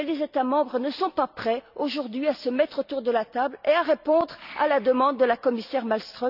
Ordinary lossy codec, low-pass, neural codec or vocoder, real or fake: none; 5.4 kHz; none; real